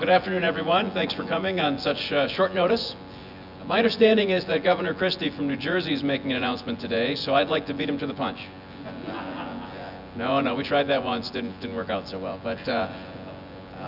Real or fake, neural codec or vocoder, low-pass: fake; vocoder, 24 kHz, 100 mel bands, Vocos; 5.4 kHz